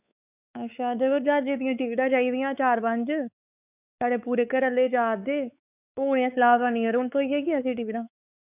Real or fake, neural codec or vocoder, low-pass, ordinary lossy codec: fake; codec, 16 kHz, 4 kbps, X-Codec, WavLM features, trained on Multilingual LibriSpeech; 3.6 kHz; none